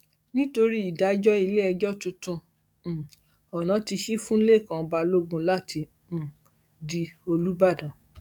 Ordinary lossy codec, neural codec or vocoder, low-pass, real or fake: none; codec, 44.1 kHz, 7.8 kbps, DAC; 19.8 kHz; fake